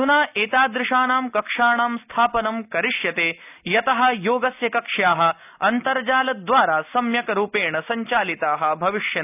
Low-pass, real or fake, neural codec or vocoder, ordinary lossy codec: 3.6 kHz; real; none; none